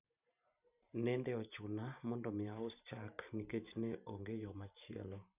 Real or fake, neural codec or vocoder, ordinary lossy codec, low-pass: fake; vocoder, 44.1 kHz, 128 mel bands every 512 samples, BigVGAN v2; none; 3.6 kHz